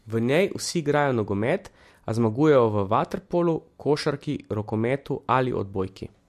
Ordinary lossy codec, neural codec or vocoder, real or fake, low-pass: MP3, 64 kbps; none; real; 14.4 kHz